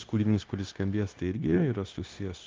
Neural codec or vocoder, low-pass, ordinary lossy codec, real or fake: codec, 16 kHz, 0.9 kbps, LongCat-Audio-Codec; 7.2 kHz; Opus, 24 kbps; fake